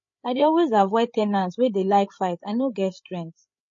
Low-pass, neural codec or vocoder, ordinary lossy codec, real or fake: 7.2 kHz; codec, 16 kHz, 8 kbps, FreqCodec, larger model; MP3, 32 kbps; fake